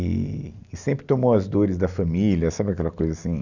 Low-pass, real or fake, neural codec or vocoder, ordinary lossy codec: 7.2 kHz; real; none; none